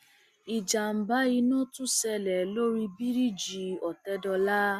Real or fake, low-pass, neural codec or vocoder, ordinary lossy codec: real; 14.4 kHz; none; Opus, 64 kbps